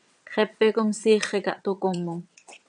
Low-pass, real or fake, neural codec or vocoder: 9.9 kHz; fake; vocoder, 22.05 kHz, 80 mel bands, WaveNeXt